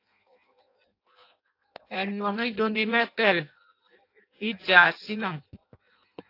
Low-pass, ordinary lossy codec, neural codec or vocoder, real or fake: 5.4 kHz; AAC, 32 kbps; codec, 16 kHz in and 24 kHz out, 0.6 kbps, FireRedTTS-2 codec; fake